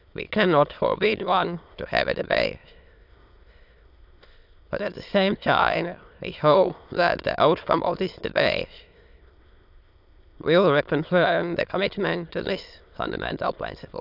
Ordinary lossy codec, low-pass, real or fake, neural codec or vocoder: AAC, 48 kbps; 5.4 kHz; fake; autoencoder, 22.05 kHz, a latent of 192 numbers a frame, VITS, trained on many speakers